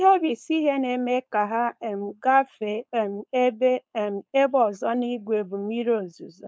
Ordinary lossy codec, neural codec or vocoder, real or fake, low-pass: none; codec, 16 kHz, 4.8 kbps, FACodec; fake; none